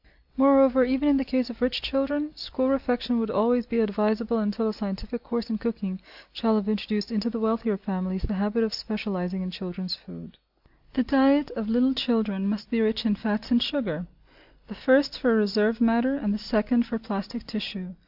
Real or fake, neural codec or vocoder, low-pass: real; none; 5.4 kHz